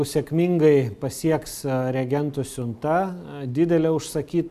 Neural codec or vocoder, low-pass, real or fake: none; 14.4 kHz; real